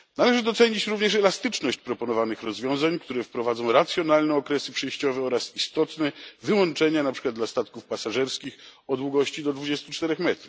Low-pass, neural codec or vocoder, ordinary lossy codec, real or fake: none; none; none; real